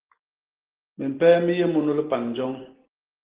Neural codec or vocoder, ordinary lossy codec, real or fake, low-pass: none; Opus, 16 kbps; real; 3.6 kHz